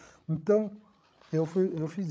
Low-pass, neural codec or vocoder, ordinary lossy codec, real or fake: none; codec, 16 kHz, 16 kbps, FreqCodec, larger model; none; fake